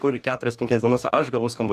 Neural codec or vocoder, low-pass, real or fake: codec, 44.1 kHz, 2.6 kbps, DAC; 14.4 kHz; fake